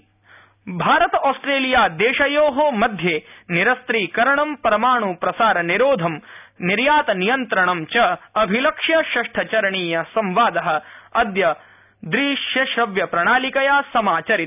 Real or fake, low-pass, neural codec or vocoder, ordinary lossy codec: real; 3.6 kHz; none; none